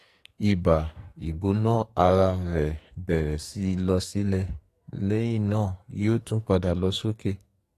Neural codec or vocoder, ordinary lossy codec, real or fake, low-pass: codec, 44.1 kHz, 2.6 kbps, SNAC; AAC, 48 kbps; fake; 14.4 kHz